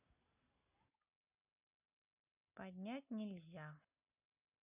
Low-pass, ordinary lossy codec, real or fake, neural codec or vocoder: 3.6 kHz; none; real; none